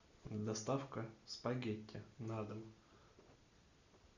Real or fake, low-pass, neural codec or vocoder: real; 7.2 kHz; none